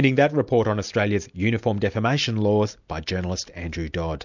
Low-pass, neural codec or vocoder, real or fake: 7.2 kHz; none; real